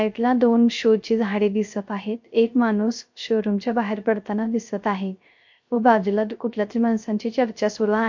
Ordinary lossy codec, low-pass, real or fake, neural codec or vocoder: MP3, 48 kbps; 7.2 kHz; fake; codec, 16 kHz, 0.3 kbps, FocalCodec